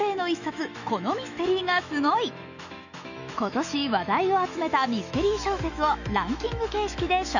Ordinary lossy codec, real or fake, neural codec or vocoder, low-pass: none; fake; autoencoder, 48 kHz, 128 numbers a frame, DAC-VAE, trained on Japanese speech; 7.2 kHz